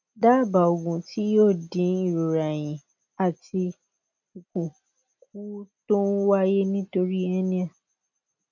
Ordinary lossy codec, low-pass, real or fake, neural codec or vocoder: none; 7.2 kHz; real; none